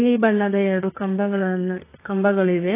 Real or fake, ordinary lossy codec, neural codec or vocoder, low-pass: fake; AAC, 24 kbps; codec, 32 kHz, 1.9 kbps, SNAC; 3.6 kHz